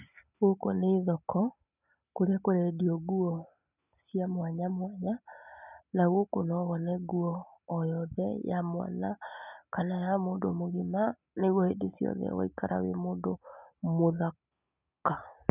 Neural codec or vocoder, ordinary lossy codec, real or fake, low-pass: none; none; real; 3.6 kHz